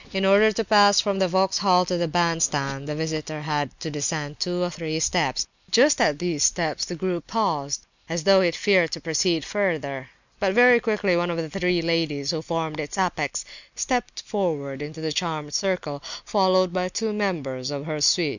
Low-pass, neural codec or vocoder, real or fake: 7.2 kHz; none; real